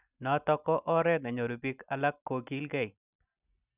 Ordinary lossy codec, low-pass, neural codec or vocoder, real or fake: none; 3.6 kHz; none; real